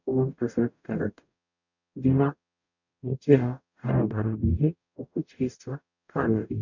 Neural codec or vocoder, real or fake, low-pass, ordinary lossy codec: codec, 44.1 kHz, 0.9 kbps, DAC; fake; 7.2 kHz; none